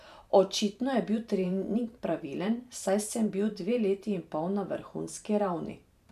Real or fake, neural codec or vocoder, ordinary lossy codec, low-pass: fake; vocoder, 44.1 kHz, 128 mel bands every 512 samples, BigVGAN v2; none; 14.4 kHz